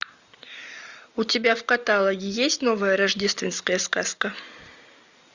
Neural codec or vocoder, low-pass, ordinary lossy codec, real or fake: none; 7.2 kHz; Opus, 64 kbps; real